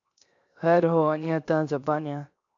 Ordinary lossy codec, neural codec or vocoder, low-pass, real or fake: AAC, 48 kbps; codec, 16 kHz, 0.7 kbps, FocalCodec; 7.2 kHz; fake